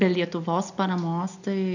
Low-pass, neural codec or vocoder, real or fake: 7.2 kHz; none; real